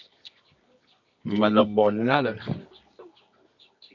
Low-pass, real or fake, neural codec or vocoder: 7.2 kHz; fake; codec, 24 kHz, 0.9 kbps, WavTokenizer, medium music audio release